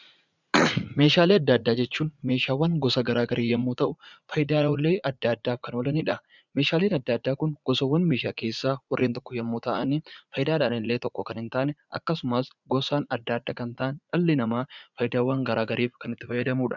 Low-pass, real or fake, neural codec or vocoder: 7.2 kHz; fake; vocoder, 44.1 kHz, 80 mel bands, Vocos